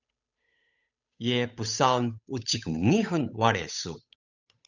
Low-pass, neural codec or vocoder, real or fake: 7.2 kHz; codec, 16 kHz, 8 kbps, FunCodec, trained on Chinese and English, 25 frames a second; fake